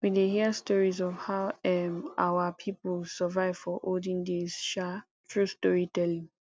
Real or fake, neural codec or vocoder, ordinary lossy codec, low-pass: real; none; none; none